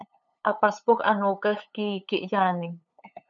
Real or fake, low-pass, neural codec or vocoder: fake; 7.2 kHz; codec, 16 kHz, 8 kbps, FunCodec, trained on LibriTTS, 25 frames a second